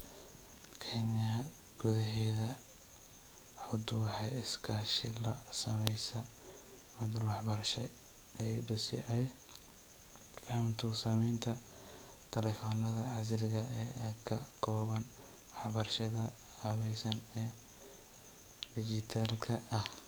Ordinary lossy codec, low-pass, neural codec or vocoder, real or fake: none; none; codec, 44.1 kHz, 7.8 kbps, DAC; fake